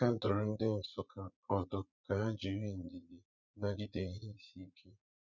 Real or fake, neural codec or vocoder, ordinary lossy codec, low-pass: fake; vocoder, 44.1 kHz, 80 mel bands, Vocos; none; 7.2 kHz